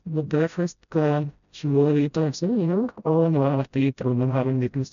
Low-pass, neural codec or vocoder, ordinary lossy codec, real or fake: 7.2 kHz; codec, 16 kHz, 0.5 kbps, FreqCodec, smaller model; none; fake